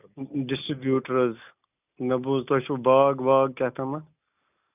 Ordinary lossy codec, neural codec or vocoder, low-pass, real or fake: none; none; 3.6 kHz; real